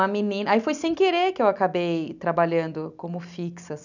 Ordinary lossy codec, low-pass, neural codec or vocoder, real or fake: none; 7.2 kHz; none; real